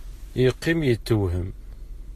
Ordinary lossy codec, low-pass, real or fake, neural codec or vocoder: AAC, 48 kbps; 14.4 kHz; real; none